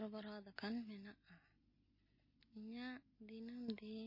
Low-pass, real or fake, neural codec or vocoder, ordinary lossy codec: 5.4 kHz; real; none; MP3, 24 kbps